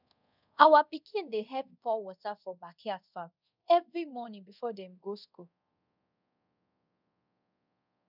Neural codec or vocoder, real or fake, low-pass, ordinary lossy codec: codec, 24 kHz, 0.5 kbps, DualCodec; fake; 5.4 kHz; none